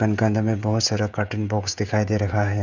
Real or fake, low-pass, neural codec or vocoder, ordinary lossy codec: fake; 7.2 kHz; codec, 16 kHz, 16 kbps, FreqCodec, smaller model; none